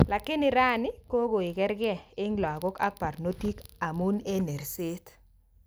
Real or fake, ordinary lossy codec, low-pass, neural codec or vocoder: real; none; none; none